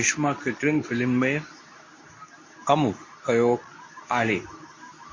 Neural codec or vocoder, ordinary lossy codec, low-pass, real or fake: codec, 24 kHz, 0.9 kbps, WavTokenizer, medium speech release version 2; MP3, 32 kbps; 7.2 kHz; fake